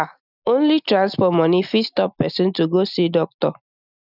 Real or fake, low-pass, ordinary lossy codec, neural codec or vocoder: real; 5.4 kHz; none; none